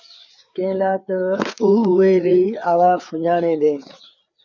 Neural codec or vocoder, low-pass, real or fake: codec, 16 kHz, 4 kbps, FreqCodec, larger model; 7.2 kHz; fake